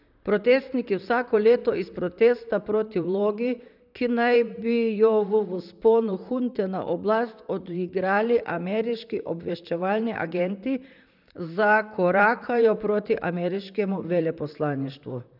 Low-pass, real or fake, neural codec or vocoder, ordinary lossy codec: 5.4 kHz; fake; vocoder, 44.1 kHz, 128 mel bands, Pupu-Vocoder; none